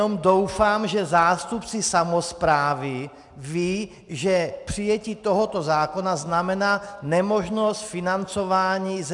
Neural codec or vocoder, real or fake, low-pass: none; real; 10.8 kHz